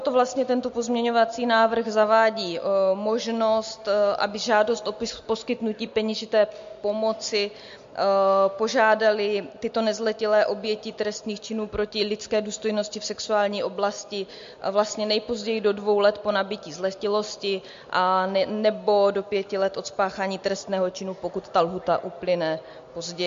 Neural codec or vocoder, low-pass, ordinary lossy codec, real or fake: none; 7.2 kHz; MP3, 48 kbps; real